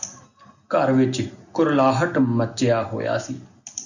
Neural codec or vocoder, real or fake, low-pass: none; real; 7.2 kHz